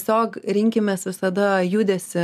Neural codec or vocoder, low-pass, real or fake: none; 14.4 kHz; real